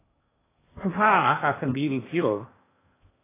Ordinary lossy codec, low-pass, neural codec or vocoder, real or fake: AAC, 24 kbps; 3.6 kHz; codec, 16 kHz in and 24 kHz out, 0.8 kbps, FocalCodec, streaming, 65536 codes; fake